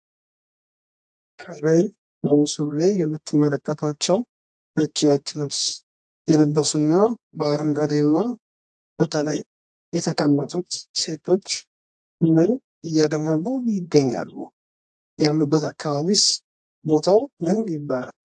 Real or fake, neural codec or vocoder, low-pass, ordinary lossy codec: fake; codec, 24 kHz, 0.9 kbps, WavTokenizer, medium music audio release; 10.8 kHz; AAC, 64 kbps